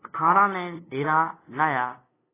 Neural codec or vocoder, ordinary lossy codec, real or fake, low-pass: codec, 16 kHz, 2 kbps, FunCodec, trained on LibriTTS, 25 frames a second; AAC, 16 kbps; fake; 3.6 kHz